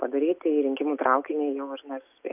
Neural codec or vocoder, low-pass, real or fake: none; 3.6 kHz; real